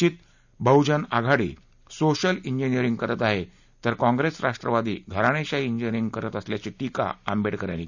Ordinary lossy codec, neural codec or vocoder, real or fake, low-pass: none; none; real; 7.2 kHz